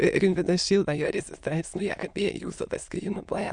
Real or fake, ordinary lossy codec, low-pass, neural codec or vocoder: fake; Opus, 64 kbps; 9.9 kHz; autoencoder, 22.05 kHz, a latent of 192 numbers a frame, VITS, trained on many speakers